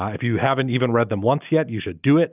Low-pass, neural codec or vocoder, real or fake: 3.6 kHz; none; real